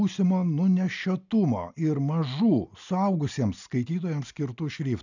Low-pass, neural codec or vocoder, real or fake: 7.2 kHz; none; real